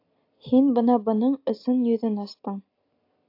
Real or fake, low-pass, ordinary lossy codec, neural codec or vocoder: real; 5.4 kHz; AAC, 32 kbps; none